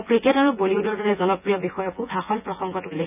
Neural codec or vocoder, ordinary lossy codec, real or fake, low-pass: vocoder, 24 kHz, 100 mel bands, Vocos; none; fake; 3.6 kHz